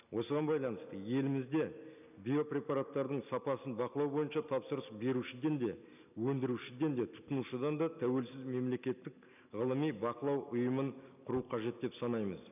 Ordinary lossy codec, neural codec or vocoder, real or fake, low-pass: none; none; real; 3.6 kHz